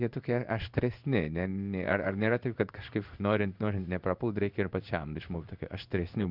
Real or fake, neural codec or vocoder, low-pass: fake; codec, 16 kHz in and 24 kHz out, 1 kbps, XY-Tokenizer; 5.4 kHz